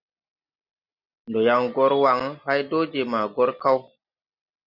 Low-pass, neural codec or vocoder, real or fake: 5.4 kHz; none; real